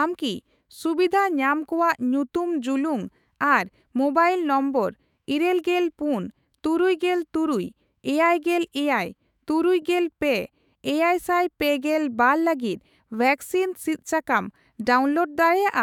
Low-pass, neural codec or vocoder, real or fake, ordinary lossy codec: 19.8 kHz; autoencoder, 48 kHz, 128 numbers a frame, DAC-VAE, trained on Japanese speech; fake; none